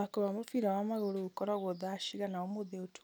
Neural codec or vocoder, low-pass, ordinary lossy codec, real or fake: none; none; none; real